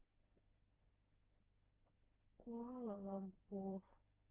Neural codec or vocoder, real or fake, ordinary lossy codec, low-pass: codec, 16 kHz, 4 kbps, FreqCodec, smaller model; fake; Opus, 24 kbps; 3.6 kHz